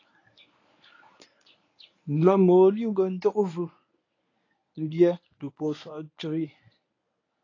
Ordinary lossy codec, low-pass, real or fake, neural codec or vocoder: AAC, 32 kbps; 7.2 kHz; fake; codec, 24 kHz, 0.9 kbps, WavTokenizer, medium speech release version 1